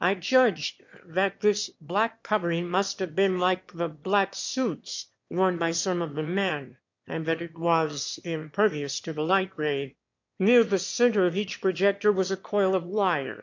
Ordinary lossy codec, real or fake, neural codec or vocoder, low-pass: MP3, 48 kbps; fake; autoencoder, 22.05 kHz, a latent of 192 numbers a frame, VITS, trained on one speaker; 7.2 kHz